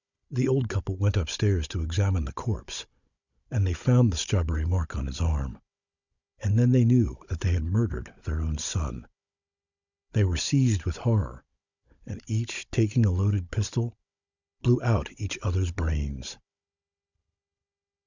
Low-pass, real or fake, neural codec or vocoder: 7.2 kHz; fake; codec, 16 kHz, 16 kbps, FunCodec, trained on Chinese and English, 50 frames a second